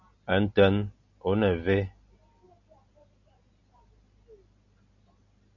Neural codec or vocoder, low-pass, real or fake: none; 7.2 kHz; real